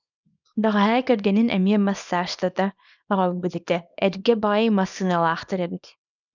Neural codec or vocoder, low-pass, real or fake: codec, 24 kHz, 0.9 kbps, WavTokenizer, small release; 7.2 kHz; fake